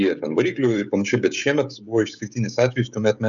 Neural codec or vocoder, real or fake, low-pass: codec, 16 kHz, 16 kbps, FreqCodec, smaller model; fake; 7.2 kHz